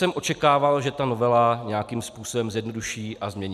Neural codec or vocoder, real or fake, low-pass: none; real; 14.4 kHz